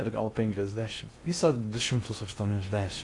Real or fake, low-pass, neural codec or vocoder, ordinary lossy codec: fake; 10.8 kHz; codec, 16 kHz in and 24 kHz out, 0.6 kbps, FocalCodec, streaming, 2048 codes; AAC, 48 kbps